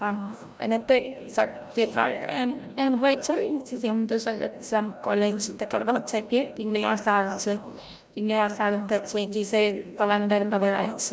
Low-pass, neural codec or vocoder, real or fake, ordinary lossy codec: none; codec, 16 kHz, 0.5 kbps, FreqCodec, larger model; fake; none